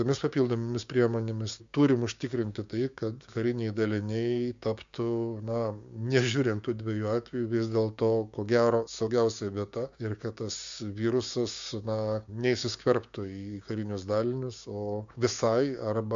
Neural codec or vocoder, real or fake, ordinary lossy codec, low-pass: codec, 16 kHz, 6 kbps, DAC; fake; MP3, 64 kbps; 7.2 kHz